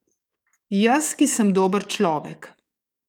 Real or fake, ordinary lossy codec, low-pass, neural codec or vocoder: fake; none; 19.8 kHz; codec, 44.1 kHz, 7.8 kbps, DAC